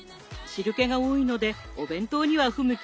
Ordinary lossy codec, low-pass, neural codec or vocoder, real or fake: none; none; none; real